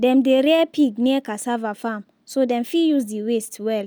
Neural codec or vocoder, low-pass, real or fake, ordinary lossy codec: none; none; real; none